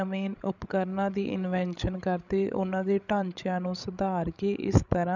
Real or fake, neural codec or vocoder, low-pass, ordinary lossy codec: fake; codec, 16 kHz, 16 kbps, FreqCodec, larger model; 7.2 kHz; none